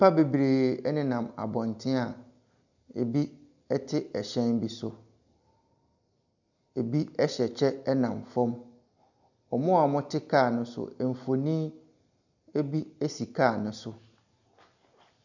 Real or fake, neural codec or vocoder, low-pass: real; none; 7.2 kHz